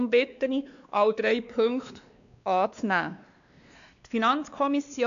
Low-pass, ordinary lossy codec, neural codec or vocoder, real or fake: 7.2 kHz; none; codec, 16 kHz, 2 kbps, X-Codec, WavLM features, trained on Multilingual LibriSpeech; fake